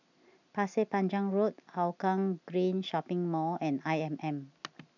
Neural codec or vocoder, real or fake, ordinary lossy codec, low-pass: none; real; none; 7.2 kHz